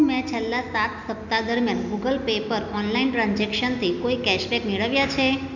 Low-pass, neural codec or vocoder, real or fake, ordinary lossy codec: 7.2 kHz; none; real; none